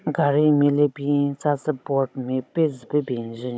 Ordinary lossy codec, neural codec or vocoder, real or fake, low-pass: none; codec, 16 kHz, 16 kbps, FunCodec, trained on Chinese and English, 50 frames a second; fake; none